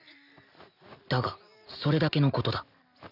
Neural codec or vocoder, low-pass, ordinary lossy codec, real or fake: none; 5.4 kHz; none; real